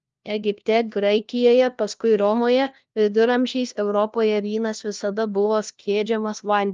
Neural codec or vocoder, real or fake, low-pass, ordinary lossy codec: codec, 16 kHz, 1 kbps, FunCodec, trained on LibriTTS, 50 frames a second; fake; 7.2 kHz; Opus, 24 kbps